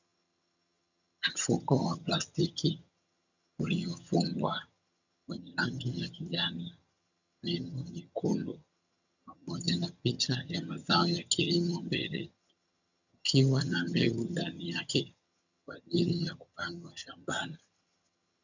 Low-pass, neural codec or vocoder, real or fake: 7.2 kHz; vocoder, 22.05 kHz, 80 mel bands, HiFi-GAN; fake